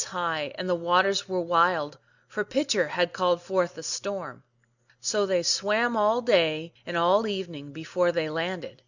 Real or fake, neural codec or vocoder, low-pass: real; none; 7.2 kHz